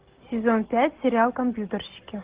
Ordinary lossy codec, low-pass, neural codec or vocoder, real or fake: Opus, 16 kbps; 3.6 kHz; none; real